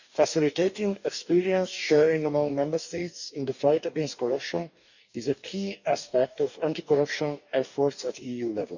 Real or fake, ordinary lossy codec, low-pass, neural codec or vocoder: fake; none; 7.2 kHz; codec, 44.1 kHz, 2.6 kbps, DAC